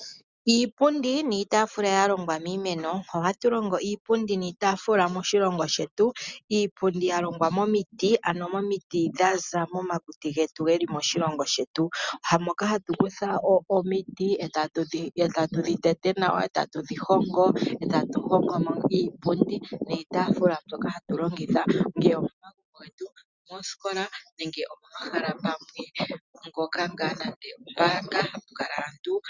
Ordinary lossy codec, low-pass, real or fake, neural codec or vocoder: Opus, 64 kbps; 7.2 kHz; real; none